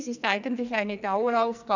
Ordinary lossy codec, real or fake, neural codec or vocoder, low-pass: none; fake; codec, 44.1 kHz, 2.6 kbps, SNAC; 7.2 kHz